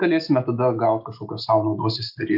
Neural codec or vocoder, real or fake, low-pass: none; real; 5.4 kHz